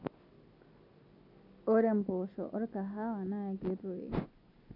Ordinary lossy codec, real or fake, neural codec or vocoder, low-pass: none; real; none; 5.4 kHz